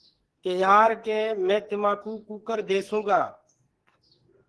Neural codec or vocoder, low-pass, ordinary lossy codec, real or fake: codec, 44.1 kHz, 2.6 kbps, SNAC; 10.8 kHz; Opus, 16 kbps; fake